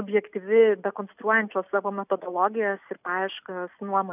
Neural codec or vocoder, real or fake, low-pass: none; real; 3.6 kHz